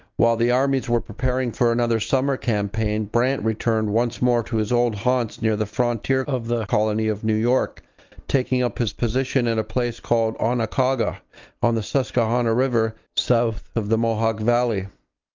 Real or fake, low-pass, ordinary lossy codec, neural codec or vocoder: real; 7.2 kHz; Opus, 24 kbps; none